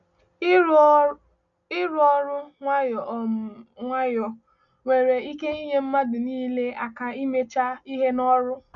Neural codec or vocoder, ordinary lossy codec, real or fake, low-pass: none; none; real; 7.2 kHz